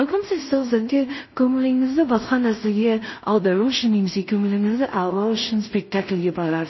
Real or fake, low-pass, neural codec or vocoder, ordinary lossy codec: fake; 7.2 kHz; codec, 16 kHz in and 24 kHz out, 0.4 kbps, LongCat-Audio-Codec, two codebook decoder; MP3, 24 kbps